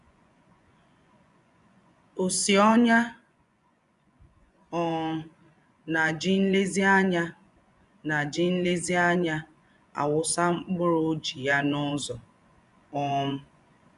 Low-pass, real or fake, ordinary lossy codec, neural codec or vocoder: 10.8 kHz; fake; none; vocoder, 24 kHz, 100 mel bands, Vocos